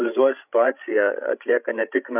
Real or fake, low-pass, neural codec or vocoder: fake; 3.6 kHz; codec, 16 kHz, 16 kbps, FreqCodec, larger model